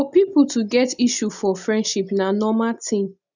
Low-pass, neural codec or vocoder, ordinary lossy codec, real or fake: 7.2 kHz; none; none; real